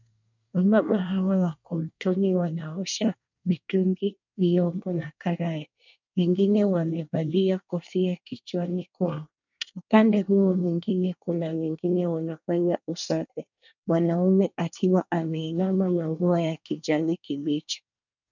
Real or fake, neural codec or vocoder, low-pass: fake; codec, 24 kHz, 1 kbps, SNAC; 7.2 kHz